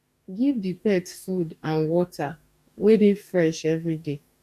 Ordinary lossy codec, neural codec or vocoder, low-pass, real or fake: none; codec, 44.1 kHz, 2.6 kbps, DAC; 14.4 kHz; fake